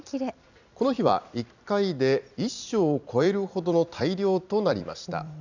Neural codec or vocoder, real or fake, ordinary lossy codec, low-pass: vocoder, 44.1 kHz, 128 mel bands every 256 samples, BigVGAN v2; fake; none; 7.2 kHz